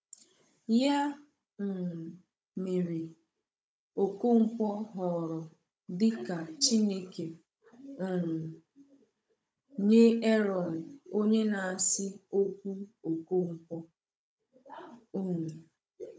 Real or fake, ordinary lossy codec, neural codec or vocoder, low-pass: fake; none; codec, 16 kHz, 16 kbps, FunCodec, trained on Chinese and English, 50 frames a second; none